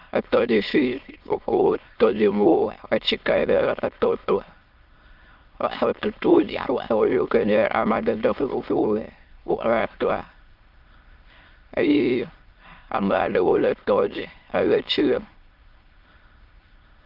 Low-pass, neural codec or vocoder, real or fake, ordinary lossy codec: 5.4 kHz; autoencoder, 22.05 kHz, a latent of 192 numbers a frame, VITS, trained on many speakers; fake; Opus, 24 kbps